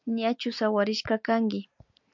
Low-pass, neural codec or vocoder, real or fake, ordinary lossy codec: 7.2 kHz; none; real; MP3, 64 kbps